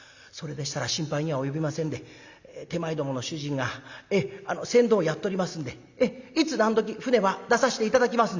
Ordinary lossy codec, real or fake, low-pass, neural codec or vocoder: Opus, 64 kbps; real; 7.2 kHz; none